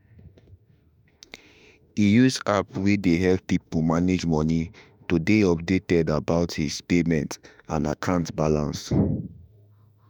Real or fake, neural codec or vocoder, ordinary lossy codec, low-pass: fake; autoencoder, 48 kHz, 32 numbers a frame, DAC-VAE, trained on Japanese speech; none; 19.8 kHz